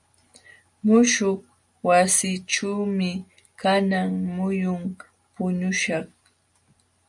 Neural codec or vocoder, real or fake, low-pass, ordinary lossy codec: none; real; 10.8 kHz; MP3, 96 kbps